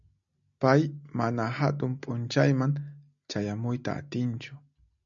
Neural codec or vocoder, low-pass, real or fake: none; 7.2 kHz; real